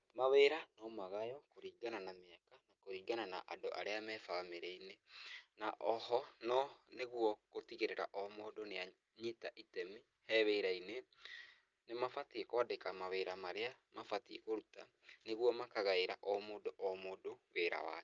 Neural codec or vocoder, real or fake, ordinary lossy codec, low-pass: none; real; Opus, 24 kbps; 7.2 kHz